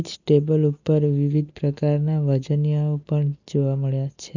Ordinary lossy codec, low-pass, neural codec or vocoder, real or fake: none; 7.2 kHz; none; real